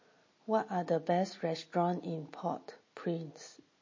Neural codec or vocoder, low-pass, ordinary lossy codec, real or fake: none; 7.2 kHz; MP3, 32 kbps; real